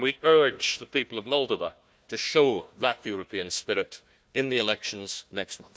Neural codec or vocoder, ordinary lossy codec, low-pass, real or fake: codec, 16 kHz, 1 kbps, FunCodec, trained on Chinese and English, 50 frames a second; none; none; fake